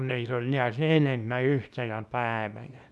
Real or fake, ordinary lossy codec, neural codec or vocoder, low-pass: fake; none; codec, 24 kHz, 0.9 kbps, WavTokenizer, small release; none